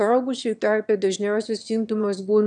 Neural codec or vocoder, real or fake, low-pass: autoencoder, 22.05 kHz, a latent of 192 numbers a frame, VITS, trained on one speaker; fake; 9.9 kHz